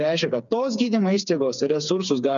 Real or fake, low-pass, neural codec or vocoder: fake; 7.2 kHz; codec, 16 kHz, 4 kbps, FreqCodec, smaller model